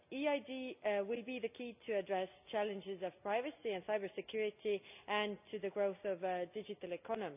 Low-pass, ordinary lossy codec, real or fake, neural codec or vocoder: 3.6 kHz; none; real; none